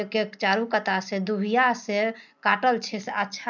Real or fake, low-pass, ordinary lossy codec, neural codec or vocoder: real; 7.2 kHz; none; none